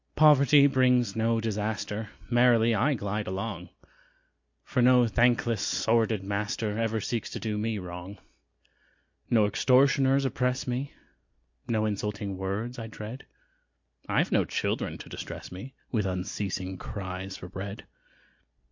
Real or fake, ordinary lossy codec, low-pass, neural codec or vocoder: real; MP3, 48 kbps; 7.2 kHz; none